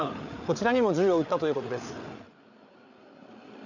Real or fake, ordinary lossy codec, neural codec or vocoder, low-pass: fake; none; codec, 16 kHz, 8 kbps, FreqCodec, larger model; 7.2 kHz